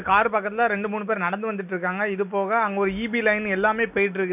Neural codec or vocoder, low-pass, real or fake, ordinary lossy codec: none; 3.6 kHz; real; none